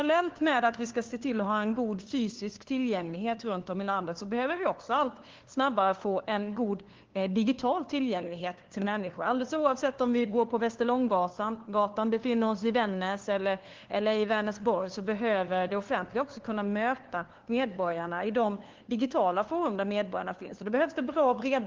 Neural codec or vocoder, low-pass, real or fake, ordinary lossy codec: codec, 16 kHz, 2 kbps, FunCodec, trained on LibriTTS, 25 frames a second; 7.2 kHz; fake; Opus, 16 kbps